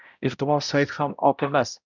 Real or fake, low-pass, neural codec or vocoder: fake; 7.2 kHz; codec, 16 kHz, 0.5 kbps, X-Codec, HuBERT features, trained on balanced general audio